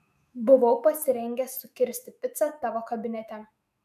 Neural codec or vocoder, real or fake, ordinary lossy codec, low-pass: autoencoder, 48 kHz, 128 numbers a frame, DAC-VAE, trained on Japanese speech; fake; MP3, 96 kbps; 14.4 kHz